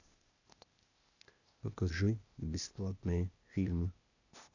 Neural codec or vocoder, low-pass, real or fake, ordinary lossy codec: codec, 16 kHz, 0.8 kbps, ZipCodec; 7.2 kHz; fake; none